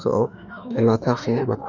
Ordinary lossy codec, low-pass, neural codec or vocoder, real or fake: none; 7.2 kHz; autoencoder, 48 kHz, 32 numbers a frame, DAC-VAE, trained on Japanese speech; fake